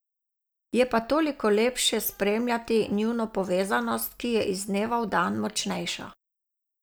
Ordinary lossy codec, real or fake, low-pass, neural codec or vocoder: none; real; none; none